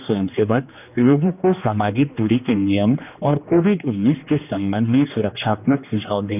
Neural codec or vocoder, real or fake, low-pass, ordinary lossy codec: codec, 16 kHz, 2 kbps, X-Codec, HuBERT features, trained on general audio; fake; 3.6 kHz; none